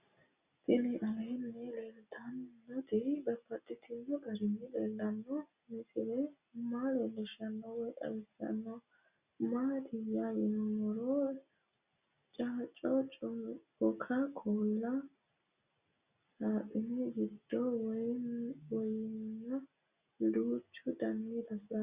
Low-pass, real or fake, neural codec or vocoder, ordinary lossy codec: 3.6 kHz; real; none; Opus, 64 kbps